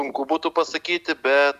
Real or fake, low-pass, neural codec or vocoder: real; 14.4 kHz; none